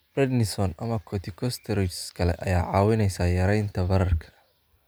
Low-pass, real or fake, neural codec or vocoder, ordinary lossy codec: none; real; none; none